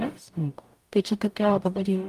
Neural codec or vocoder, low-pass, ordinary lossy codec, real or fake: codec, 44.1 kHz, 0.9 kbps, DAC; 14.4 kHz; Opus, 32 kbps; fake